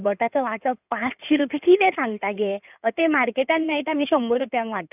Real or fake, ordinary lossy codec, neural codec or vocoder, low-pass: fake; none; codec, 16 kHz in and 24 kHz out, 2.2 kbps, FireRedTTS-2 codec; 3.6 kHz